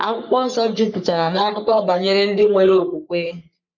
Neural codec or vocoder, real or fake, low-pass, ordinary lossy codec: codec, 44.1 kHz, 3.4 kbps, Pupu-Codec; fake; 7.2 kHz; none